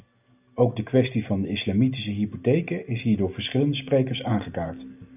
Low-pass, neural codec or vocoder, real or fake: 3.6 kHz; none; real